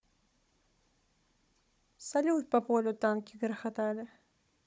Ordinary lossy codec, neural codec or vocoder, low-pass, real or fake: none; codec, 16 kHz, 16 kbps, FunCodec, trained on Chinese and English, 50 frames a second; none; fake